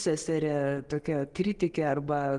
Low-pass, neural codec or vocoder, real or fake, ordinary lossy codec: 10.8 kHz; none; real; Opus, 24 kbps